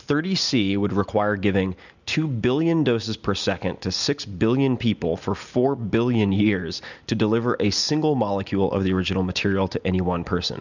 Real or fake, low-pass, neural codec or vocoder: real; 7.2 kHz; none